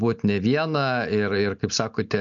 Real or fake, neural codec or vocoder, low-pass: real; none; 7.2 kHz